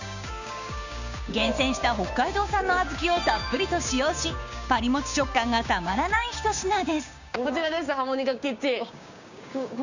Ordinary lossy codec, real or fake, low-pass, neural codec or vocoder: none; fake; 7.2 kHz; codec, 16 kHz, 6 kbps, DAC